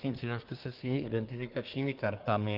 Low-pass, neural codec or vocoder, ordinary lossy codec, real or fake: 5.4 kHz; codec, 24 kHz, 1 kbps, SNAC; Opus, 32 kbps; fake